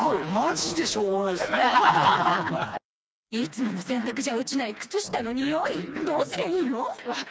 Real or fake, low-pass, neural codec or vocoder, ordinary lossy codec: fake; none; codec, 16 kHz, 2 kbps, FreqCodec, smaller model; none